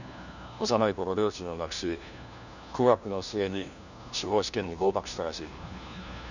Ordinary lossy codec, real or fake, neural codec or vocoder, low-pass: none; fake; codec, 16 kHz, 1 kbps, FunCodec, trained on LibriTTS, 50 frames a second; 7.2 kHz